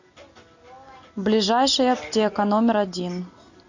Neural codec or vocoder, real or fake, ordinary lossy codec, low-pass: none; real; AAC, 48 kbps; 7.2 kHz